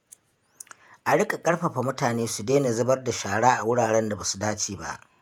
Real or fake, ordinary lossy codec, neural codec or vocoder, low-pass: fake; none; vocoder, 48 kHz, 128 mel bands, Vocos; 19.8 kHz